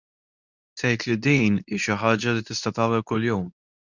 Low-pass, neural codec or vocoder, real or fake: 7.2 kHz; codec, 24 kHz, 0.9 kbps, WavTokenizer, medium speech release version 2; fake